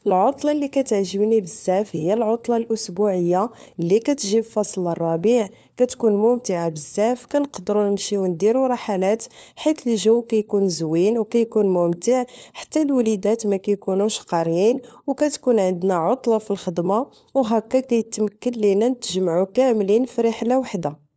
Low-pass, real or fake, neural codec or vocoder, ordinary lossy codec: none; fake; codec, 16 kHz, 4 kbps, FunCodec, trained on LibriTTS, 50 frames a second; none